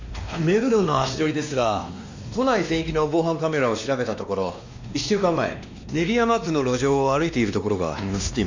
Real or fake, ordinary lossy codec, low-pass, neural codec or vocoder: fake; MP3, 64 kbps; 7.2 kHz; codec, 16 kHz, 2 kbps, X-Codec, WavLM features, trained on Multilingual LibriSpeech